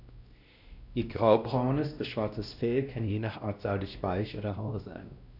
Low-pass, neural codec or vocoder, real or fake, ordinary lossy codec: 5.4 kHz; codec, 16 kHz, 1 kbps, X-Codec, WavLM features, trained on Multilingual LibriSpeech; fake; none